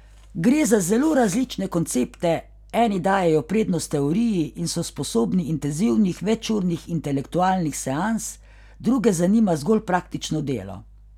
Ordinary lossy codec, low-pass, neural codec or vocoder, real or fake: none; 19.8 kHz; none; real